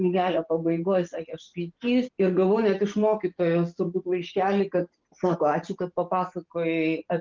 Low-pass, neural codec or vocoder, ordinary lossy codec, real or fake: 7.2 kHz; none; Opus, 32 kbps; real